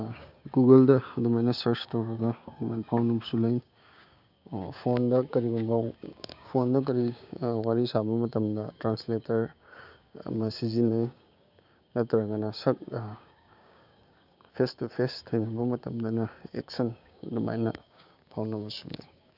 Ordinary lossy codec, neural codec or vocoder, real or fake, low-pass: none; codec, 16 kHz, 6 kbps, DAC; fake; 5.4 kHz